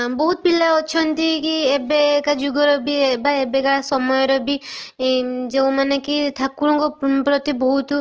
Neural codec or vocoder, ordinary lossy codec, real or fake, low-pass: none; Opus, 16 kbps; real; 7.2 kHz